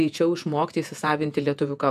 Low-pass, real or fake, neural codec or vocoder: 14.4 kHz; fake; vocoder, 48 kHz, 128 mel bands, Vocos